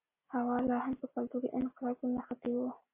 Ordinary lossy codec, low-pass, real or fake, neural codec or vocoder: MP3, 24 kbps; 3.6 kHz; real; none